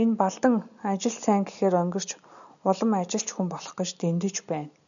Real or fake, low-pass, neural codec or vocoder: real; 7.2 kHz; none